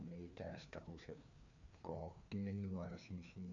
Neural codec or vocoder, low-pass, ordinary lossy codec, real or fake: codec, 16 kHz, 2 kbps, FreqCodec, larger model; 7.2 kHz; none; fake